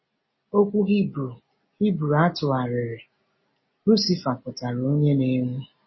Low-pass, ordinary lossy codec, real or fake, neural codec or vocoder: 7.2 kHz; MP3, 24 kbps; real; none